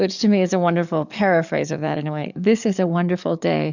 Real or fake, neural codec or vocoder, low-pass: fake; codec, 44.1 kHz, 7.8 kbps, DAC; 7.2 kHz